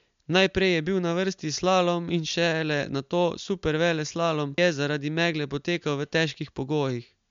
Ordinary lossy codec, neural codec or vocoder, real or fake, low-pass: MP3, 64 kbps; none; real; 7.2 kHz